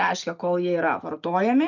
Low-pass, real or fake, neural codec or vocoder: 7.2 kHz; real; none